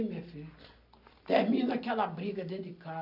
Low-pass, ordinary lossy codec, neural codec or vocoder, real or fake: 5.4 kHz; AAC, 48 kbps; none; real